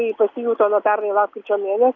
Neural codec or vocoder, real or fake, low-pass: none; real; 7.2 kHz